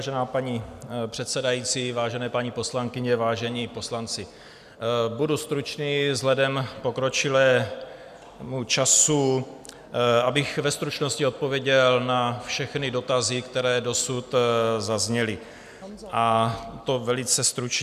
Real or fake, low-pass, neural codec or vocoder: real; 14.4 kHz; none